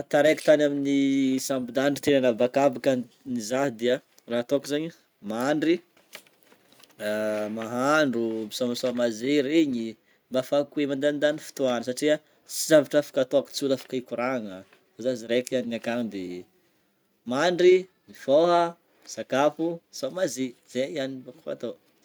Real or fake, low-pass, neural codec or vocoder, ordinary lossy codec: real; none; none; none